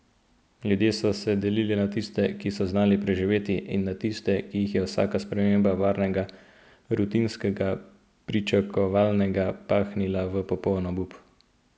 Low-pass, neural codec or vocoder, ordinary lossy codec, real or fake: none; none; none; real